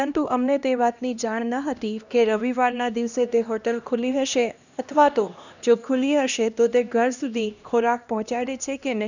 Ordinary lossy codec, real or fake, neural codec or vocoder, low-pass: none; fake; codec, 16 kHz, 1 kbps, X-Codec, HuBERT features, trained on LibriSpeech; 7.2 kHz